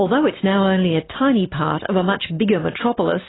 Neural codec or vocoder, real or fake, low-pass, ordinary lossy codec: none; real; 7.2 kHz; AAC, 16 kbps